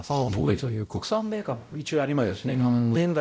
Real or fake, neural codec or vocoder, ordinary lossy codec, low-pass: fake; codec, 16 kHz, 0.5 kbps, X-Codec, WavLM features, trained on Multilingual LibriSpeech; none; none